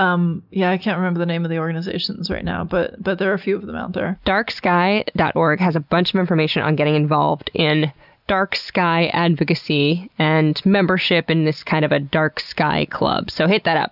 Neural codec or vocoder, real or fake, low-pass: none; real; 5.4 kHz